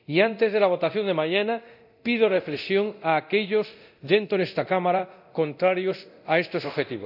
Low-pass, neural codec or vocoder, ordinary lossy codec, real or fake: 5.4 kHz; codec, 24 kHz, 0.9 kbps, DualCodec; none; fake